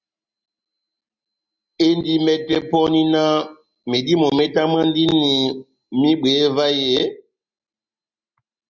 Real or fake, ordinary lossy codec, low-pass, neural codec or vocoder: real; Opus, 64 kbps; 7.2 kHz; none